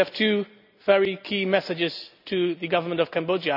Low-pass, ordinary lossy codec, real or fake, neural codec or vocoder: 5.4 kHz; none; real; none